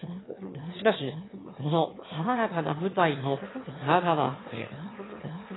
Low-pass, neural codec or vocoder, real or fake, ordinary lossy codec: 7.2 kHz; autoencoder, 22.05 kHz, a latent of 192 numbers a frame, VITS, trained on one speaker; fake; AAC, 16 kbps